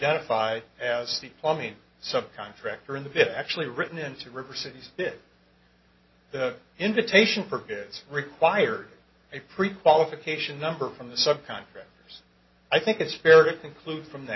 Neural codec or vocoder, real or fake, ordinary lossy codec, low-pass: none; real; MP3, 24 kbps; 7.2 kHz